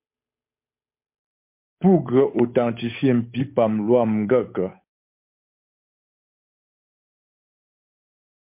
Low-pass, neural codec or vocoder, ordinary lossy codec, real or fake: 3.6 kHz; codec, 16 kHz, 8 kbps, FunCodec, trained on Chinese and English, 25 frames a second; MP3, 32 kbps; fake